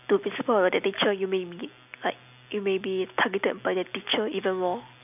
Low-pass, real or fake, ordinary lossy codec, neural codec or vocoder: 3.6 kHz; real; none; none